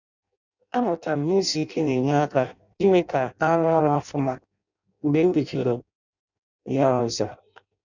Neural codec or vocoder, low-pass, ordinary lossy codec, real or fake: codec, 16 kHz in and 24 kHz out, 0.6 kbps, FireRedTTS-2 codec; 7.2 kHz; none; fake